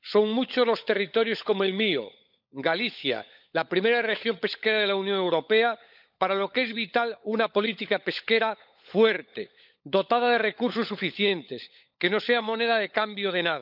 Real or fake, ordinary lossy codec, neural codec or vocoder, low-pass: fake; none; codec, 16 kHz, 16 kbps, FunCodec, trained on LibriTTS, 50 frames a second; 5.4 kHz